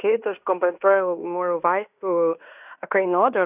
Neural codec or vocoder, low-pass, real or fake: codec, 16 kHz in and 24 kHz out, 0.9 kbps, LongCat-Audio-Codec, fine tuned four codebook decoder; 3.6 kHz; fake